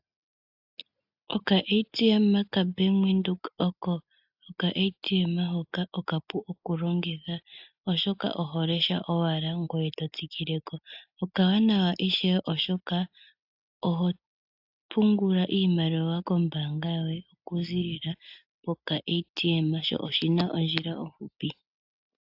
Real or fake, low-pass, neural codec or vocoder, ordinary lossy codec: real; 5.4 kHz; none; AAC, 48 kbps